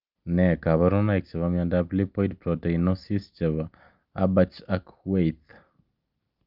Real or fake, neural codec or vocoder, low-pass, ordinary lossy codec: real; none; 5.4 kHz; Opus, 24 kbps